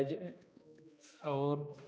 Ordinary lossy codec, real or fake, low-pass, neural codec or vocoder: none; fake; none; codec, 16 kHz, 1 kbps, X-Codec, HuBERT features, trained on balanced general audio